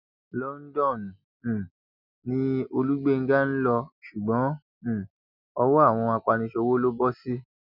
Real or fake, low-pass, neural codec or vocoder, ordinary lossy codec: real; 5.4 kHz; none; none